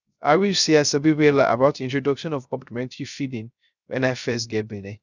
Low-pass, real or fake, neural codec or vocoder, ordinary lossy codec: 7.2 kHz; fake; codec, 16 kHz, 0.3 kbps, FocalCodec; none